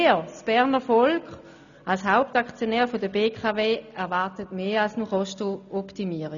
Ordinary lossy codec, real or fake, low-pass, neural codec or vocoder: none; real; 7.2 kHz; none